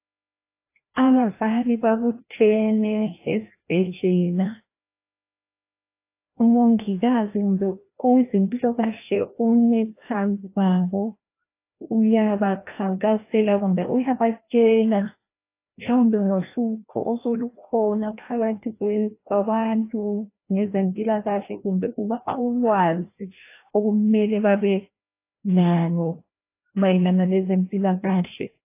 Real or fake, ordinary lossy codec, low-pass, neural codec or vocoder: fake; AAC, 24 kbps; 3.6 kHz; codec, 16 kHz, 1 kbps, FreqCodec, larger model